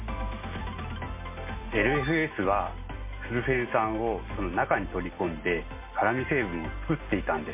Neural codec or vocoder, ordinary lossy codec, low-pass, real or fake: none; MP3, 24 kbps; 3.6 kHz; real